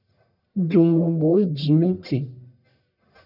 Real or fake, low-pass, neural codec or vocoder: fake; 5.4 kHz; codec, 44.1 kHz, 1.7 kbps, Pupu-Codec